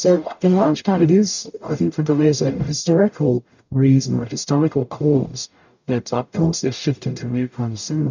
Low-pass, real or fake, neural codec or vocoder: 7.2 kHz; fake; codec, 44.1 kHz, 0.9 kbps, DAC